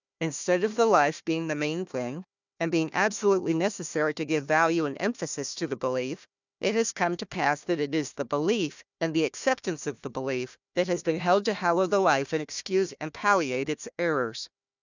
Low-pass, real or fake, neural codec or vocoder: 7.2 kHz; fake; codec, 16 kHz, 1 kbps, FunCodec, trained on Chinese and English, 50 frames a second